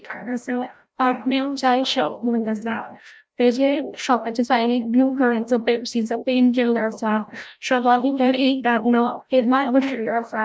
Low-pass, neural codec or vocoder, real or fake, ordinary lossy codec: none; codec, 16 kHz, 0.5 kbps, FreqCodec, larger model; fake; none